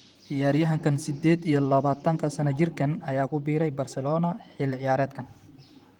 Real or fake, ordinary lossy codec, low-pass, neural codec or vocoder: fake; Opus, 24 kbps; 19.8 kHz; vocoder, 44.1 kHz, 128 mel bands, Pupu-Vocoder